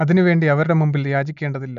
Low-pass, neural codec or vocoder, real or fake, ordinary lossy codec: 7.2 kHz; none; real; none